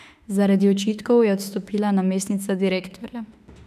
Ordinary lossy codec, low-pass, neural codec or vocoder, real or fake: none; 14.4 kHz; autoencoder, 48 kHz, 32 numbers a frame, DAC-VAE, trained on Japanese speech; fake